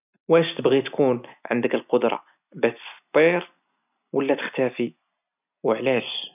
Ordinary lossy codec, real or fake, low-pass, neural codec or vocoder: none; real; 3.6 kHz; none